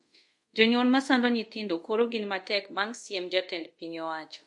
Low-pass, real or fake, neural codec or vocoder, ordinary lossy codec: 10.8 kHz; fake; codec, 24 kHz, 0.5 kbps, DualCodec; MP3, 48 kbps